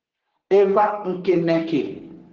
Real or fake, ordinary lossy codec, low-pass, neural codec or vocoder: fake; Opus, 16 kbps; 7.2 kHz; codec, 44.1 kHz, 3.4 kbps, Pupu-Codec